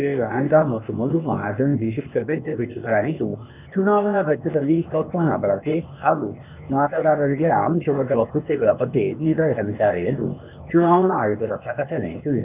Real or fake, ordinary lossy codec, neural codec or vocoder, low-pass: fake; none; codec, 16 kHz, 0.8 kbps, ZipCodec; 3.6 kHz